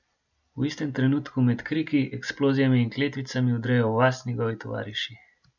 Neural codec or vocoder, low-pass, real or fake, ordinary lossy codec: none; 7.2 kHz; real; none